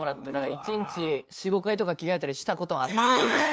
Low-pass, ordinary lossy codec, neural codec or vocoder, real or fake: none; none; codec, 16 kHz, 2 kbps, FunCodec, trained on LibriTTS, 25 frames a second; fake